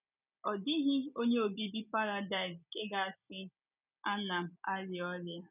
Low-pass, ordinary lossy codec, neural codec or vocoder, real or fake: 3.6 kHz; none; none; real